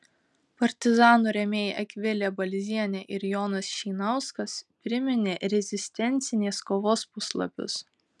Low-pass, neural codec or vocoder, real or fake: 10.8 kHz; none; real